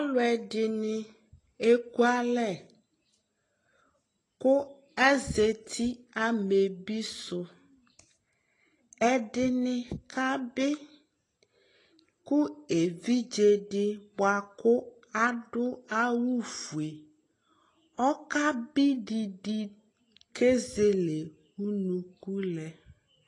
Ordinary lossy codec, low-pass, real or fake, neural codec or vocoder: AAC, 32 kbps; 10.8 kHz; real; none